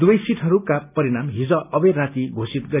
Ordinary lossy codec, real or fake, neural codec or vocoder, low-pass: none; real; none; 3.6 kHz